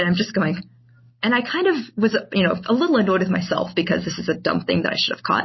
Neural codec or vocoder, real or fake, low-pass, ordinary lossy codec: none; real; 7.2 kHz; MP3, 24 kbps